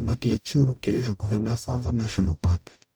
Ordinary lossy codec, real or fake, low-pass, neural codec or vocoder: none; fake; none; codec, 44.1 kHz, 0.9 kbps, DAC